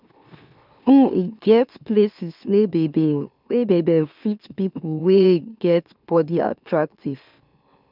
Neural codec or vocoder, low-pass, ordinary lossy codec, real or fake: autoencoder, 44.1 kHz, a latent of 192 numbers a frame, MeloTTS; 5.4 kHz; none; fake